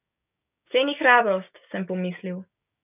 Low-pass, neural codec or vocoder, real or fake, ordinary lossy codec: 3.6 kHz; codec, 16 kHz, 6 kbps, DAC; fake; none